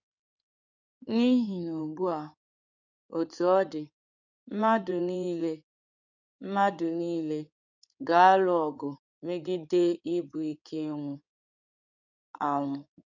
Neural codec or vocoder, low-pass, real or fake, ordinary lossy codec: codec, 16 kHz in and 24 kHz out, 2.2 kbps, FireRedTTS-2 codec; 7.2 kHz; fake; none